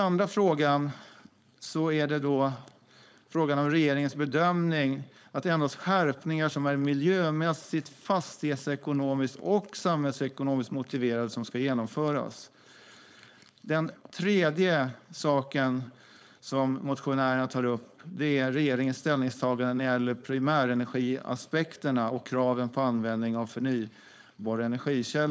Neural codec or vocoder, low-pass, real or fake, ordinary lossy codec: codec, 16 kHz, 4.8 kbps, FACodec; none; fake; none